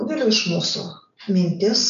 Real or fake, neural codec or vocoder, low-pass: real; none; 7.2 kHz